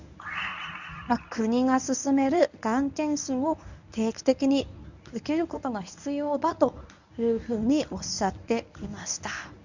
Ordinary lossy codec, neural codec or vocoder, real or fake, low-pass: none; codec, 24 kHz, 0.9 kbps, WavTokenizer, medium speech release version 1; fake; 7.2 kHz